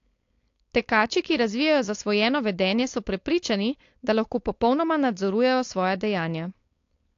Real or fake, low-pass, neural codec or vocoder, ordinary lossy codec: fake; 7.2 kHz; codec, 16 kHz, 4.8 kbps, FACodec; AAC, 48 kbps